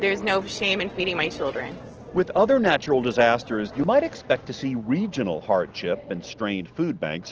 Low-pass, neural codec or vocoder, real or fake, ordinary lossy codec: 7.2 kHz; none; real; Opus, 16 kbps